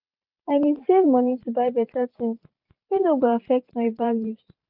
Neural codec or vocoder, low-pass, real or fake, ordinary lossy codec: vocoder, 44.1 kHz, 128 mel bands every 512 samples, BigVGAN v2; 5.4 kHz; fake; none